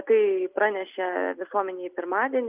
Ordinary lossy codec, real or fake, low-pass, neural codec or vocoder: Opus, 24 kbps; real; 3.6 kHz; none